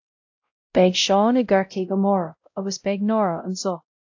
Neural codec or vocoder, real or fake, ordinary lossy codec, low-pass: codec, 16 kHz, 0.5 kbps, X-Codec, WavLM features, trained on Multilingual LibriSpeech; fake; AAC, 48 kbps; 7.2 kHz